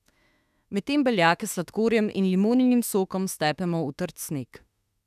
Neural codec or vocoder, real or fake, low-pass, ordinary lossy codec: autoencoder, 48 kHz, 32 numbers a frame, DAC-VAE, trained on Japanese speech; fake; 14.4 kHz; none